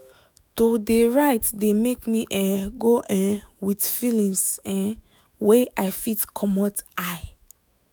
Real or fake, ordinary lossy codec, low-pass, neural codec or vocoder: fake; none; none; autoencoder, 48 kHz, 128 numbers a frame, DAC-VAE, trained on Japanese speech